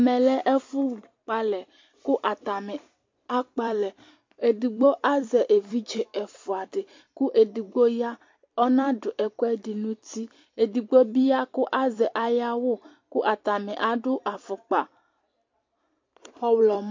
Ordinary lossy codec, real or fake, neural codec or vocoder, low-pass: MP3, 48 kbps; fake; vocoder, 44.1 kHz, 128 mel bands every 256 samples, BigVGAN v2; 7.2 kHz